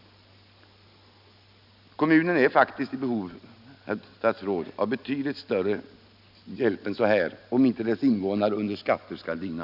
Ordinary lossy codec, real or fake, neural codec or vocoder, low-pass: none; real; none; 5.4 kHz